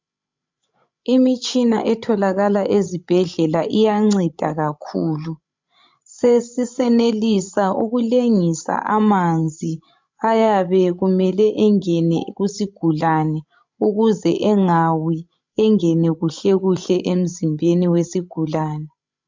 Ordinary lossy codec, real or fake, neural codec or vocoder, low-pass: MP3, 64 kbps; fake; codec, 16 kHz, 16 kbps, FreqCodec, larger model; 7.2 kHz